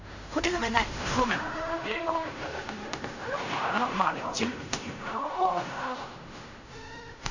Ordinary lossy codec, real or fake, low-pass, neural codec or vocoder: none; fake; 7.2 kHz; codec, 16 kHz in and 24 kHz out, 0.4 kbps, LongCat-Audio-Codec, fine tuned four codebook decoder